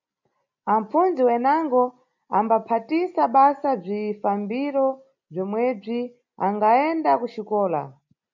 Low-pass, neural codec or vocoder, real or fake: 7.2 kHz; none; real